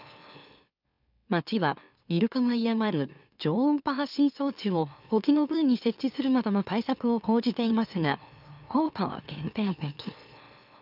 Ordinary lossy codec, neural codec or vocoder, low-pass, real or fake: none; autoencoder, 44.1 kHz, a latent of 192 numbers a frame, MeloTTS; 5.4 kHz; fake